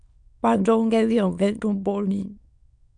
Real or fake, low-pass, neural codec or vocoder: fake; 9.9 kHz; autoencoder, 22.05 kHz, a latent of 192 numbers a frame, VITS, trained on many speakers